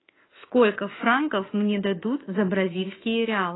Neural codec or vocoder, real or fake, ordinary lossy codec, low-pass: autoencoder, 48 kHz, 32 numbers a frame, DAC-VAE, trained on Japanese speech; fake; AAC, 16 kbps; 7.2 kHz